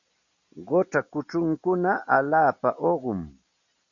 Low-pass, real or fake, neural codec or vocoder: 7.2 kHz; real; none